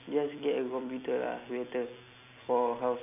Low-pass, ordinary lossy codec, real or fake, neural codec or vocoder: 3.6 kHz; none; real; none